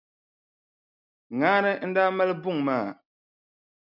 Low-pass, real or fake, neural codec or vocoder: 5.4 kHz; real; none